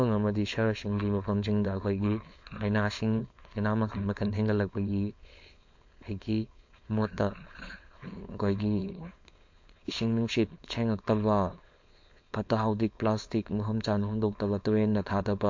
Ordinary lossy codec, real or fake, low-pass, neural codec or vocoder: MP3, 48 kbps; fake; 7.2 kHz; codec, 16 kHz, 4.8 kbps, FACodec